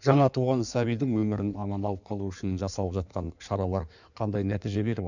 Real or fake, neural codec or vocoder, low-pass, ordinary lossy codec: fake; codec, 16 kHz in and 24 kHz out, 1.1 kbps, FireRedTTS-2 codec; 7.2 kHz; none